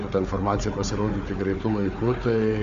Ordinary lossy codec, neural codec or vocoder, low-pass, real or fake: MP3, 64 kbps; codec, 16 kHz, 4 kbps, FunCodec, trained on Chinese and English, 50 frames a second; 7.2 kHz; fake